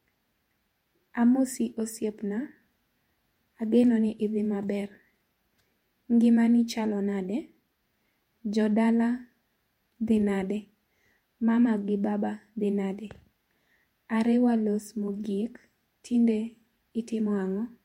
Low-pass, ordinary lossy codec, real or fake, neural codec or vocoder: 19.8 kHz; MP3, 64 kbps; fake; vocoder, 48 kHz, 128 mel bands, Vocos